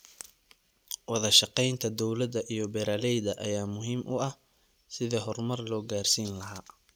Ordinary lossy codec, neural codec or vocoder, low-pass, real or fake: none; none; none; real